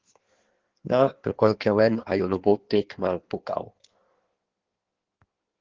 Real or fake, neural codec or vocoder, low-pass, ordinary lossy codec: fake; codec, 16 kHz in and 24 kHz out, 1.1 kbps, FireRedTTS-2 codec; 7.2 kHz; Opus, 16 kbps